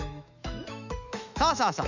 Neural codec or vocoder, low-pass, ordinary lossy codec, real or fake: autoencoder, 48 kHz, 128 numbers a frame, DAC-VAE, trained on Japanese speech; 7.2 kHz; none; fake